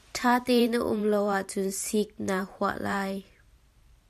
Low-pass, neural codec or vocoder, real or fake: 14.4 kHz; vocoder, 44.1 kHz, 128 mel bands every 512 samples, BigVGAN v2; fake